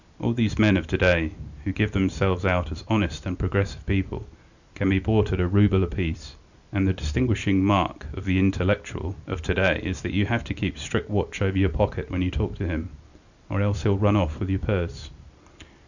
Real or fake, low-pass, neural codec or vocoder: real; 7.2 kHz; none